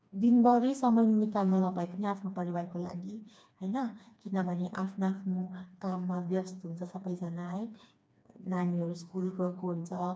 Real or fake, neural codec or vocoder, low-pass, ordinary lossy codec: fake; codec, 16 kHz, 2 kbps, FreqCodec, smaller model; none; none